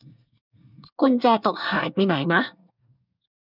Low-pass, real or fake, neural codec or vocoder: 5.4 kHz; fake; codec, 24 kHz, 1 kbps, SNAC